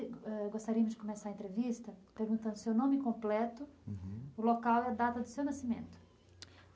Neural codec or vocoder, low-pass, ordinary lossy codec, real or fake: none; none; none; real